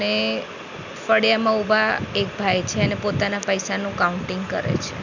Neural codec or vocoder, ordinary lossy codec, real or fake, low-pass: none; none; real; 7.2 kHz